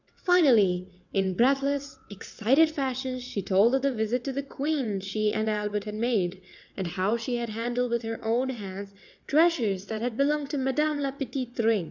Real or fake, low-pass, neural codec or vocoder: fake; 7.2 kHz; vocoder, 22.05 kHz, 80 mel bands, WaveNeXt